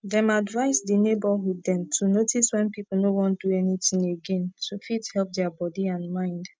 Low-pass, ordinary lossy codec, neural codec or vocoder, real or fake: none; none; none; real